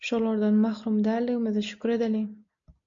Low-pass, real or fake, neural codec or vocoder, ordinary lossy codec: 7.2 kHz; real; none; MP3, 64 kbps